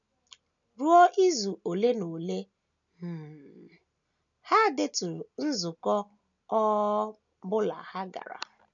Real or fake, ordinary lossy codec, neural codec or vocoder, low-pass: real; none; none; 7.2 kHz